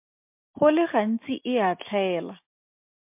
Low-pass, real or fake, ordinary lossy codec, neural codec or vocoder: 3.6 kHz; real; MP3, 32 kbps; none